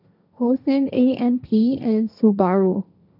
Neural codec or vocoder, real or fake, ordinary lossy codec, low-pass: codec, 16 kHz, 1.1 kbps, Voila-Tokenizer; fake; none; 5.4 kHz